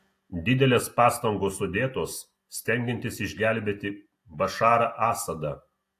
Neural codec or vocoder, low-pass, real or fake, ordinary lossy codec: none; 14.4 kHz; real; AAC, 64 kbps